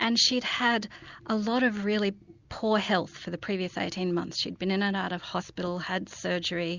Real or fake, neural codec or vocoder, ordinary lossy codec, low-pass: real; none; Opus, 64 kbps; 7.2 kHz